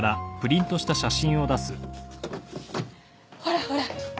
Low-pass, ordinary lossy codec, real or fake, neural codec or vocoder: none; none; real; none